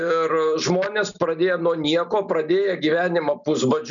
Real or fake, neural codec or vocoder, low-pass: real; none; 7.2 kHz